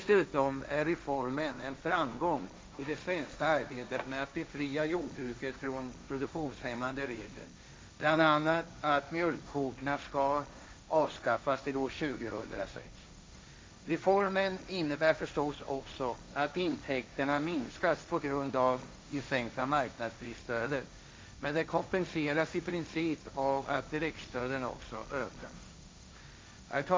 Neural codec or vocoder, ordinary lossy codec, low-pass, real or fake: codec, 16 kHz, 1.1 kbps, Voila-Tokenizer; none; none; fake